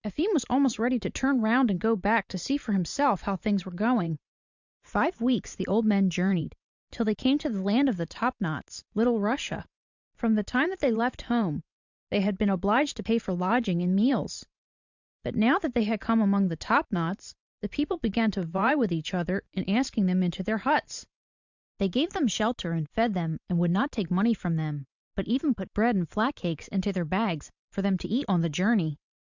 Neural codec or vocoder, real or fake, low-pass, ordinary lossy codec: vocoder, 44.1 kHz, 128 mel bands every 512 samples, BigVGAN v2; fake; 7.2 kHz; Opus, 64 kbps